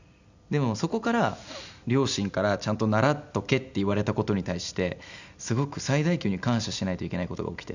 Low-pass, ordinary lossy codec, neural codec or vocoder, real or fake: 7.2 kHz; none; none; real